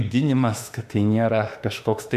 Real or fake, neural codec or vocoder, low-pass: fake; autoencoder, 48 kHz, 32 numbers a frame, DAC-VAE, trained on Japanese speech; 14.4 kHz